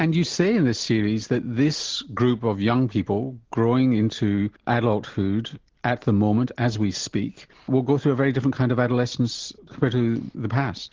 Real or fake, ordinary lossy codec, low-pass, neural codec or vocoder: real; Opus, 16 kbps; 7.2 kHz; none